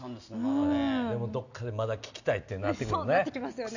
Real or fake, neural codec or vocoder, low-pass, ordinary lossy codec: real; none; 7.2 kHz; none